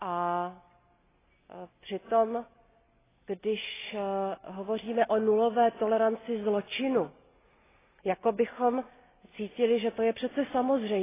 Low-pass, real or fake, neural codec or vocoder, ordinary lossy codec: 3.6 kHz; real; none; AAC, 16 kbps